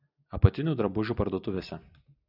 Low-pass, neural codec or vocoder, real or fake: 5.4 kHz; none; real